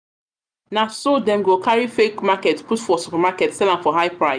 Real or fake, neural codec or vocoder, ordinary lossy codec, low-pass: real; none; none; 9.9 kHz